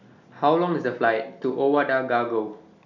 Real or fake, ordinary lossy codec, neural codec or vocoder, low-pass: real; none; none; 7.2 kHz